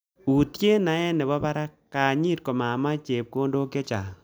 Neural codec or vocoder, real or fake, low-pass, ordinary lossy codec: none; real; none; none